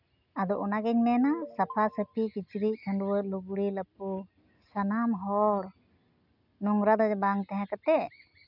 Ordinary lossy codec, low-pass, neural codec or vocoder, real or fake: none; 5.4 kHz; none; real